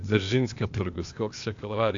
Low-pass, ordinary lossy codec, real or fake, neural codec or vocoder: 7.2 kHz; AAC, 64 kbps; fake; codec, 16 kHz, 0.8 kbps, ZipCodec